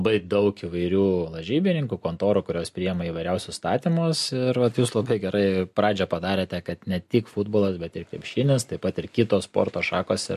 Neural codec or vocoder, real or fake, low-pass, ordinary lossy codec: none; real; 14.4 kHz; MP3, 64 kbps